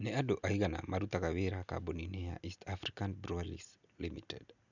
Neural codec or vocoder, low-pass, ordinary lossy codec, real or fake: none; 7.2 kHz; none; real